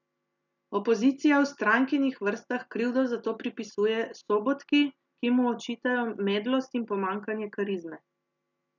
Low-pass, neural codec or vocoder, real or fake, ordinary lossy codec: 7.2 kHz; none; real; none